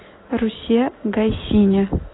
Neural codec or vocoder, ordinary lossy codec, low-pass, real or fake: none; AAC, 16 kbps; 7.2 kHz; real